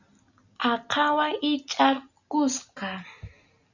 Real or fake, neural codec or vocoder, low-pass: real; none; 7.2 kHz